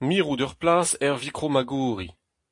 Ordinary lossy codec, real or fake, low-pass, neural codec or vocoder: AAC, 48 kbps; real; 10.8 kHz; none